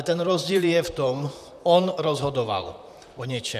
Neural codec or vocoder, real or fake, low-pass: vocoder, 44.1 kHz, 128 mel bands, Pupu-Vocoder; fake; 14.4 kHz